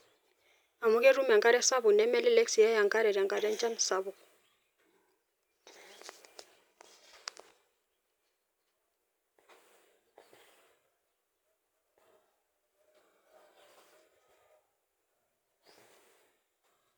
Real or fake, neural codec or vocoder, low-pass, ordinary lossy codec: real; none; none; none